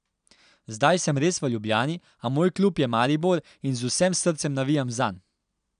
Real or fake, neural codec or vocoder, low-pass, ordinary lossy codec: real; none; 9.9 kHz; none